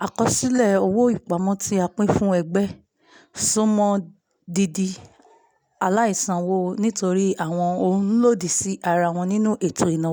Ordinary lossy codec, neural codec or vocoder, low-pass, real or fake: none; none; none; real